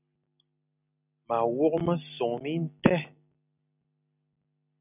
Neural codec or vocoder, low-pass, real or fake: none; 3.6 kHz; real